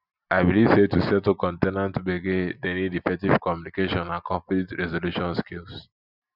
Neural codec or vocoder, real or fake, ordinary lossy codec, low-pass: none; real; none; 5.4 kHz